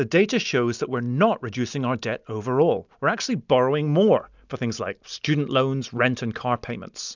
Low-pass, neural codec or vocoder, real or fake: 7.2 kHz; none; real